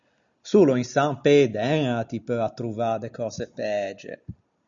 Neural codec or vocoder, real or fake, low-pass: none; real; 7.2 kHz